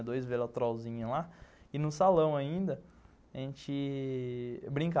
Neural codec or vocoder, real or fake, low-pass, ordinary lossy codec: none; real; none; none